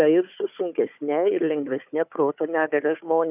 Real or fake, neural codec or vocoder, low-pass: fake; codec, 16 kHz, 4 kbps, FunCodec, trained on Chinese and English, 50 frames a second; 3.6 kHz